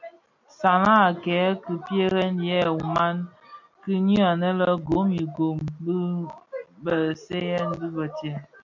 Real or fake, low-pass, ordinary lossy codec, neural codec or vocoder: real; 7.2 kHz; MP3, 64 kbps; none